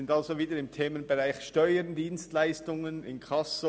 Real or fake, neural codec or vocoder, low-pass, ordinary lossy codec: real; none; none; none